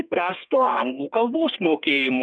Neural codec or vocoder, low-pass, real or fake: codec, 32 kHz, 1.9 kbps, SNAC; 9.9 kHz; fake